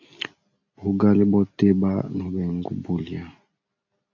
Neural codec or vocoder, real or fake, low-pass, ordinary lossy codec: none; real; 7.2 kHz; AAC, 48 kbps